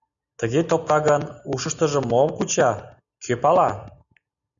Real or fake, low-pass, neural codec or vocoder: real; 7.2 kHz; none